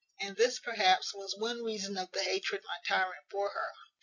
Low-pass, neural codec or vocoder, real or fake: 7.2 kHz; none; real